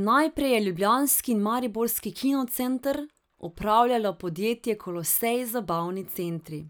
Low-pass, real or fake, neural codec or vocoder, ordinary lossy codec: none; real; none; none